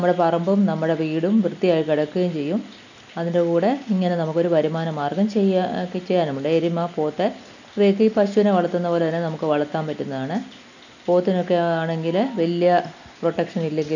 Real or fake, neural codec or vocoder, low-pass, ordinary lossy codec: real; none; 7.2 kHz; none